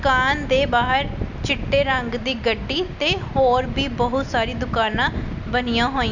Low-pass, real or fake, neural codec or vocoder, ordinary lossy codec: 7.2 kHz; real; none; none